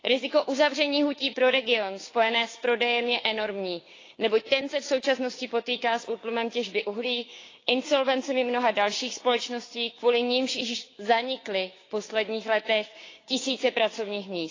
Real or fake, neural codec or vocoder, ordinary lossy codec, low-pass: fake; codec, 16 kHz, 6 kbps, DAC; AAC, 32 kbps; 7.2 kHz